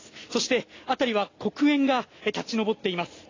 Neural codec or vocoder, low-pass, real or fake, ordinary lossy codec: none; 7.2 kHz; real; AAC, 32 kbps